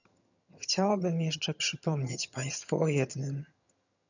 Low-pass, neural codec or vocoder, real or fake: 7.2 kHz; vocoder, 22.05 kHz, 80 mel bands, HiFi-GAN; fake